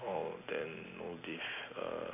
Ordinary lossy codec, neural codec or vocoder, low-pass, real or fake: none; none; 3.6 kHz; real